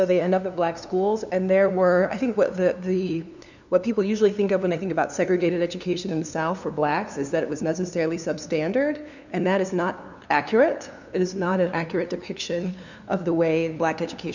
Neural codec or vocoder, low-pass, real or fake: codec, 16 kHz, 2 kbps, FunCodec, trained on LibriTTS, 25 frames a second; 7.2 kHz; fake